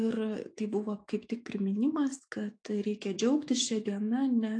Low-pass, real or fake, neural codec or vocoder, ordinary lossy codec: 9.9 kHz; fake; autoencoder, 48 kHz, 128 numbers a frame, DAC-VAE, trained on Japanese speech; AAC, 48 kbps